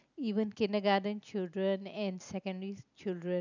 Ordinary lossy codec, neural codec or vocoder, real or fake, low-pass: none; none; real; 7.2 kHz